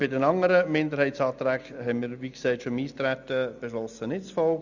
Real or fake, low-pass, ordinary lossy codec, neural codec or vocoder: real; 7.2 kHz; none; none